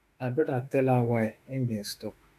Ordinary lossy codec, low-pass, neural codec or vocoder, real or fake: none; 14.4 kHz; autoencoder, 48 kHz, 32 numbers a frame, DAC-VAE, trained on Japanese speech; fake